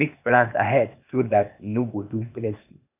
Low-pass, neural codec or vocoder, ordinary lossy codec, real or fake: 3.6 kHz; codec, 16 kHz, 0.8 kbps, ZipCodec; none; fake